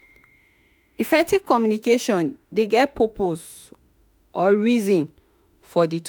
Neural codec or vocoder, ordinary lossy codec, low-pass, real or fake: autoencoder, 48 kHz, 32 numbers a frame, DAC-VAE, trained on Japanese speech; none; none; fake